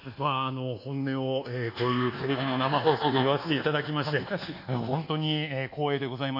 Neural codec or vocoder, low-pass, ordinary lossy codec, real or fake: codec, 24 kHz, 1.2 kbps, DualCodec; 5.4 kHz; AAC, 48 kbps; fake